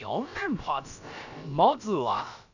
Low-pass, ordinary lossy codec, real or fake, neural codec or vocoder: 7.2 kHz; none; fake; codec, 16 kHz, about 1 kbps, DyCAST, with the encoder's durations